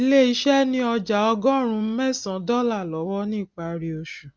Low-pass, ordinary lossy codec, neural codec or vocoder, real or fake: none; none; none; real